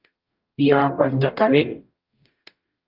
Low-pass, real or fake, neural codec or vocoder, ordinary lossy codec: 5.4 kHz; fake; codec, 44.1 kHz, 0.9 kbps, DAC; Opus, 24 kbps